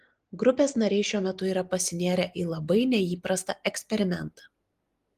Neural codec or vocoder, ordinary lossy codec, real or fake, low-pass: none; Opus, 24 kbps; real; 14.4 kHz